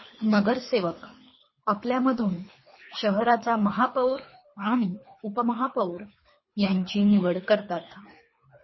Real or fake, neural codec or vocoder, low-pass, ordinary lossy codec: fake; codec, 24 kHz, 3 kbps, HILCodec; 7.2 kHz; MP3, 24 kbps